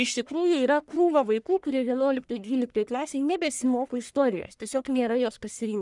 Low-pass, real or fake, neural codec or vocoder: 10.8 kHz; fake; codec, 44.1 kHz, 1.7 kbps, Pupu-Codec